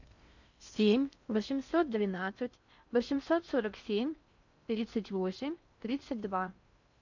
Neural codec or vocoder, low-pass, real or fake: codec, 16 kHz in and 24 kHz out, 0.6 kbps, FocalCodec, streaming, 4096 codes; 7.2 kHz; fake